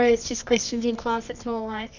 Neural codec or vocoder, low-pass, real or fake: codec, 24 kHz, 0.9 kbps, WavTokenizer, medium music audio release; 7.2 kHz; fake